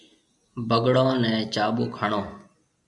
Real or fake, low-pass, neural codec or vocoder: real; 10.8 kHz; none